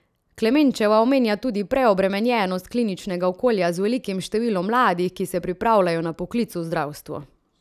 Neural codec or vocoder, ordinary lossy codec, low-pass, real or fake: none; none; 14.4 kHz; real